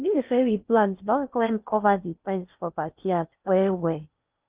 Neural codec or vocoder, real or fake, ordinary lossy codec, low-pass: codec, 16 kHz in and 24 kHz out, 0.6 kbps, FocalCodec, streaming, 4096 codes; fake; Opus, 32 kbps; 3.6 kHz